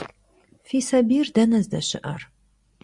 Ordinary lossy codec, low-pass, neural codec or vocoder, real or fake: Opus, 64 kbps; 10.8 kHz; none; real